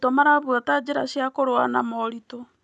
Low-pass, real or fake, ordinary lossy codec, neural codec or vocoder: none; real; none; none